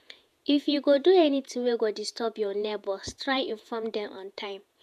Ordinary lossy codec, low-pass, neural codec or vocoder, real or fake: none; 14.4 kHz; vocoder, 48 kHz, 128 mel bands, Vocos; fake